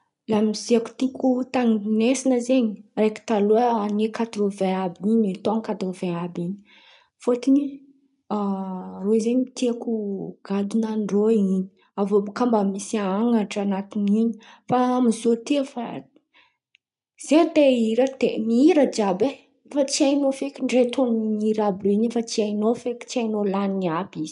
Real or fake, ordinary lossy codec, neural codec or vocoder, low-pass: real; none; none; 10.8 kHz